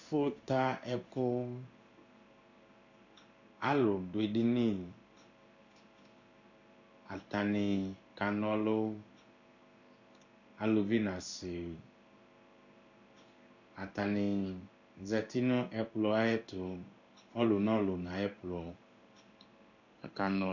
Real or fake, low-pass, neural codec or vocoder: fake; 7.2 kHz; codec, 16 kHz in and 24 kHz out, 1 kbps, XY-Tokenizer